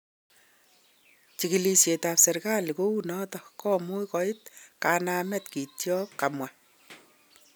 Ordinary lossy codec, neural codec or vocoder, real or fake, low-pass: none; none; real; none